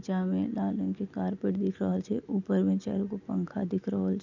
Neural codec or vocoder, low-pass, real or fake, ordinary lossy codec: none; 7.2 kHz; real; none